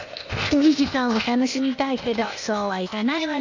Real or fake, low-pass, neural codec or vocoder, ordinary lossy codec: fake; 7.2 kHz; codec, 16 kHz, 0.8 kbps, ZipCodec; AAC, 48 kbps